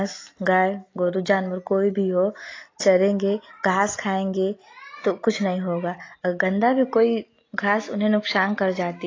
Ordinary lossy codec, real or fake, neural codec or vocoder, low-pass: AAC, 32 kbps; real; none; 7.2 kHz